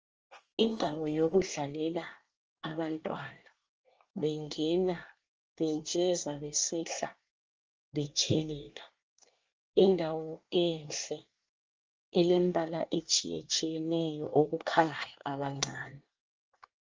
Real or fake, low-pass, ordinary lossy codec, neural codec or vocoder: fake; 7.2 kHz; Opus, 24 kbps; codec, 24 kHz, 1 kbps, SNAC